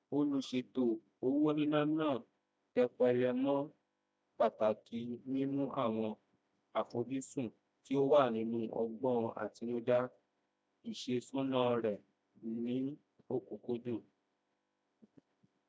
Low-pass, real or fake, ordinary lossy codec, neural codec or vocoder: none; fake; none; codec, 16 kHz, 1 kbps, FreqCodec, smaller model